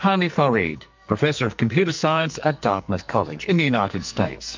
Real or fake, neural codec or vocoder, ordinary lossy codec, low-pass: fake; codec, 44.1 kHz, 2.6 kbps, SNAC; MP3, 64 kbps; 7.2 kHz